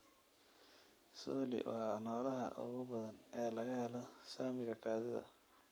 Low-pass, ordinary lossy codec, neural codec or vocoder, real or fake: none; none; codec, 44.1 kHz, 7.8 kbps, Pupu-Codec; fake